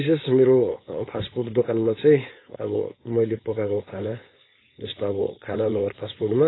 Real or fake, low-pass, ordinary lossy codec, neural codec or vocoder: fake; 7.2 kHz; AAC, 16 kbps; codec, 16 kHz, 4.8 kbps, FACodec